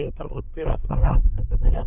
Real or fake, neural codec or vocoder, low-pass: fake; codec, 24 kHz, 1 kbps, SNAC; 3.6 kHz